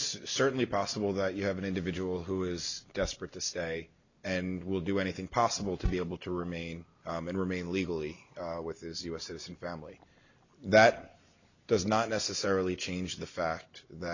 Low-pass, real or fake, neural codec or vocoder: 7.2 kHz; real; none